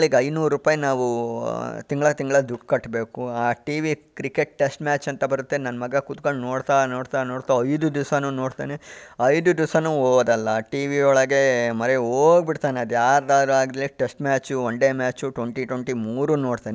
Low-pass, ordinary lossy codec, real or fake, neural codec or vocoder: none; none; real; none